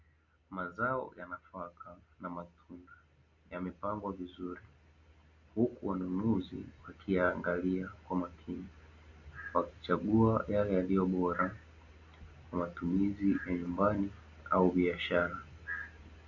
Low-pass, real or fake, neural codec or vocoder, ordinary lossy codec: 7.2 kHz; real; none; Opus, 64 kbps